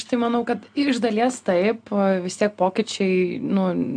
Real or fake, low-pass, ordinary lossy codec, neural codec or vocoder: real; 9.9 kHz; MP3, 64 kbps; none